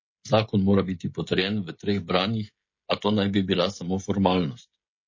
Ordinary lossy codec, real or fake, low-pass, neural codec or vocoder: MP3, 32 kbps; fake; 7.2 kHz; codec, 16 kHz, 16 kbps, FreqCodec, smaller model